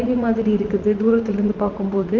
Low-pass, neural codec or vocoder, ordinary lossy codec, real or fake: 7.2 kHz; vocoder, 44.1 kHz, 80 mel bands, Vocos; Opus, 24 kbps; fake